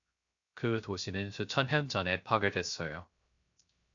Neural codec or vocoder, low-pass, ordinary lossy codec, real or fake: codec, 16 kHz, 0.3 kbps, FocalCodec; 7.2 kHz; AAC, 64 kbps; fake